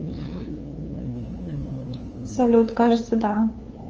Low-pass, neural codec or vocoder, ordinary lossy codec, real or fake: 7.2 kHz; codec, 16 kHz, 2 kbps, FunCodec, trained on LibriTTS, 25 frames a second; Opus, 24 kbps; fake